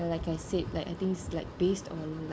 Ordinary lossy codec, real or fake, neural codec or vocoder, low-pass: none; real; none; none